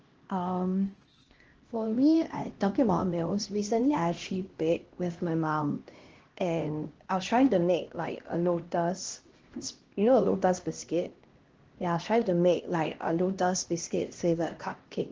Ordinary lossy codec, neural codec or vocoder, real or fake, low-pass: Opus, 16 kbps; codec, 16 kHz, 1 kbps, X-Codec, HuBERT features, trained on LibriSpeech; fake; 7.2 kHz